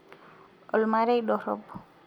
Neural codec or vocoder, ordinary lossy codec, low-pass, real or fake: none; none; 19.8 kHz; real